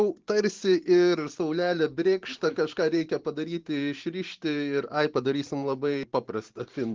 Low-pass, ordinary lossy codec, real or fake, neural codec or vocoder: 7.2 kHz; Opus, 16 kbps; fake; vocoder, 44.1 kHz, 128 mel bands every 512 samples, BigVGAN v2